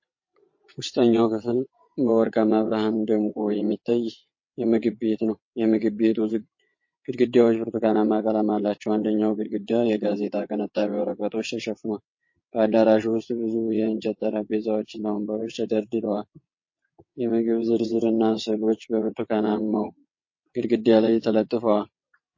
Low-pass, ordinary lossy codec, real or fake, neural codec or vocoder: 7.2 kHz; MP3, 32 kbps; fake; vocoder, 22.05 kHz, 80 mel bands, WaveNeXt